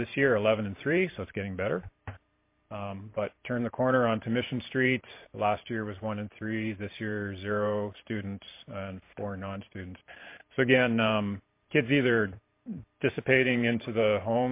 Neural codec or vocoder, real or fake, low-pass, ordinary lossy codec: vocoder, 44.1 kHz, 128 mel bands every 512 samples, BigVGAN v2; fake; 3.6 kHz; MP3, 24 kbps